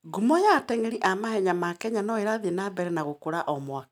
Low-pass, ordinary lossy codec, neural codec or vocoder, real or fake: 19.8 kHz; none; none; real